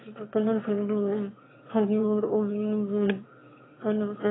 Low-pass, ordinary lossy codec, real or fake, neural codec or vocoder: 7.2 kHz; AAC, 16 kbps; fake; autoencoder, 22.05 kHz, a latent of 192 numbers a frame, VITS, trained on one speaker